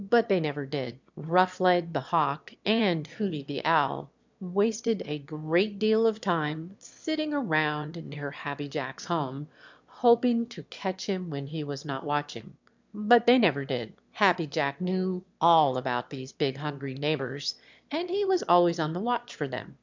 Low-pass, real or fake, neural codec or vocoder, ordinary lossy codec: 7.2 kHz; fake; autoencoder, 22.05 kHz, a latent of 192 numbers a frame, VITS, trained on one speaker; MP3, 64 kbps